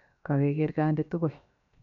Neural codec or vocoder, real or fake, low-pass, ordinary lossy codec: codec, 16 kHz, 0.7 kbps, FocalCodec; fake; 7.2 kHz; none